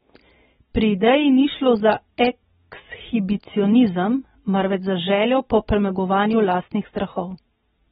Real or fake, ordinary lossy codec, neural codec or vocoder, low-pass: real; AAC, 16 kbps; none; 19.8 kHz